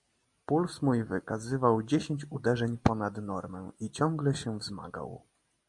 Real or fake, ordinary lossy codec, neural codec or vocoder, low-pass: real; MP3, 48 kbps; none; 10.8 kHz